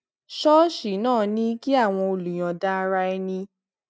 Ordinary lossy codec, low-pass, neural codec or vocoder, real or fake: none; none; none; real